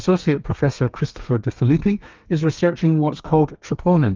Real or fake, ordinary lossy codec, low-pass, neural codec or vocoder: fake; Opus, 24 kbps; 7.2 kHz; codec, 32 kHz, 1.9 kbps, SNAC